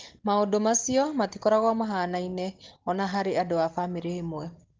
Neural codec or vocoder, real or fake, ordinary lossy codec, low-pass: none; real; Opus, 16 kbps; 7.2 kHz